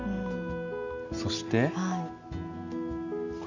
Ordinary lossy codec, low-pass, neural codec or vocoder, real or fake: none; 7.2 kHz; none; real